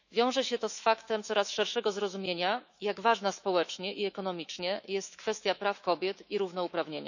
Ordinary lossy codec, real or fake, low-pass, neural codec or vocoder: none; fake; 7.2 kHz; autoencoder, 48 kHz, 128 numbers a frame, DAC-VAE, trained on Japanese speech